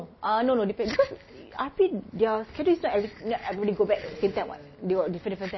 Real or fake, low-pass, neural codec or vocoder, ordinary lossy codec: real; 7.2 kHz; none; MP3, 24 kbps